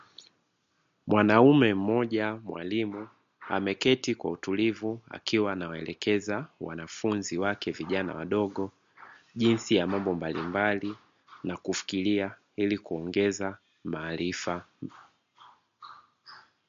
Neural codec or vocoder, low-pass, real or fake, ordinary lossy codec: none; 7.2 kHz; real; MP3, 48 kbps